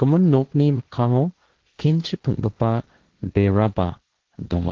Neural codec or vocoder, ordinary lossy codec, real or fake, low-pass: codec, 16 kHz, 1.1 kbps, Voila-Tokenizer; Opus, 16 kbps; fake; 7.2 kHz